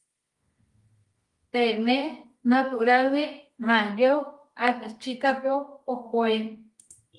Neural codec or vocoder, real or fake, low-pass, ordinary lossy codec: codec, 24 kHz, 0.9 kbps, WavTokenizer, medium music audio release; fake; 10.8 kHz; Opus, 24 kbps